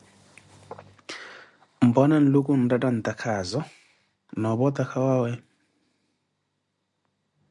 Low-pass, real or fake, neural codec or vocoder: 10.8 kHz; real; none